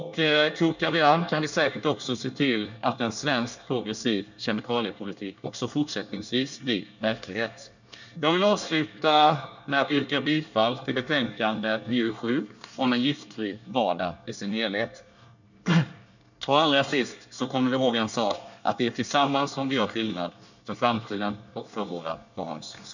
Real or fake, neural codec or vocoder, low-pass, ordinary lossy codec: fake; codec, 24 kHz, 1 kbps, SNAC; 7.2 kHz; none